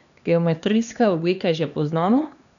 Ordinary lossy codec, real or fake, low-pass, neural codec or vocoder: none; fake; 7.2 kHz; codec, 16 kHz, 2 kbps, X-Codec, HuBERT features, trained on LibriSpeech